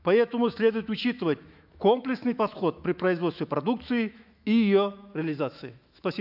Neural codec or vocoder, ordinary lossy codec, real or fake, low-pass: autoencoder, 48 kHz, 128 numbers a frame, DAC-VAE, trained on Japanese speech; AAC, 48 kbps; fake; 5.4 kHz